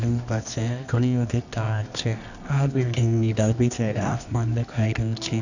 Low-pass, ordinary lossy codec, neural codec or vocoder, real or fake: 7.2 kHz; none; codec, 24 kHz, 0.9 kbps, WavTokenizer, medium music audio release; fake